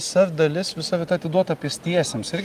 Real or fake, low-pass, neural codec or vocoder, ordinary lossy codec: real; 14.4 kHz; none; Opus, 32 kbps